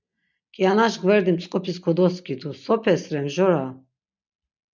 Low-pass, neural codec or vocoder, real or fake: 7.2 kHz; none; real